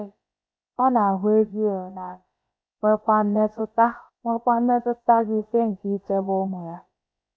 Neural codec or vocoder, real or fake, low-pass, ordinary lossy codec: codec, 16 kHz, about 1 kbps, DyCAST, with the encoder's durations; fake; none; none